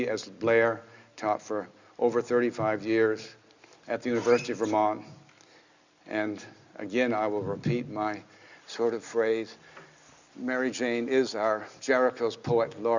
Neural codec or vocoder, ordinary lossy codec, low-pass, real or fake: none; Opus, 64 kbps; 7.2 kHz; real